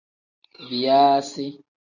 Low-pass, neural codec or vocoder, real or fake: 7.2 kHz; none; real